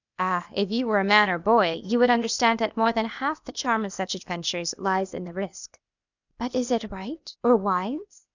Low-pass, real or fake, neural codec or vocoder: 7.2 kHz; fake; codec, 16 kHz, 0.8 kbps, ZipCodec